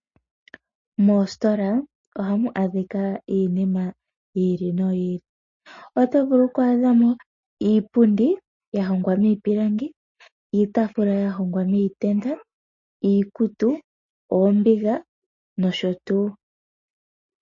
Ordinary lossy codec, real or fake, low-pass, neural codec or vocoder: MP3, 32 kbps; real; 7.2 kHz; none